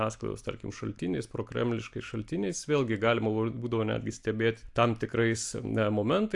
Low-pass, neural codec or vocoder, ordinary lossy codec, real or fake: 10.8 kHz; none; AAC, 64 kbps; real